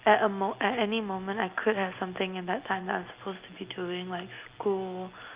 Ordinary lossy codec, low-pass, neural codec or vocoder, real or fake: Opus, 32 kbps; 3.6 kHz; none; real